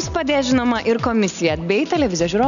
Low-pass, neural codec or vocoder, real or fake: 7.2 kHz; none; real